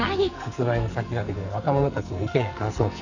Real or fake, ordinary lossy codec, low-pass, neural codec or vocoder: fake; none; 7.2 kHz; codec, 44.1 kHz, 2.6 kbps, SNAC